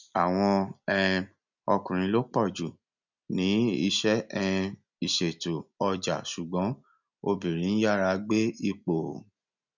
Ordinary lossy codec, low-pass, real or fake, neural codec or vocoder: none; 7.2 kHz; real; none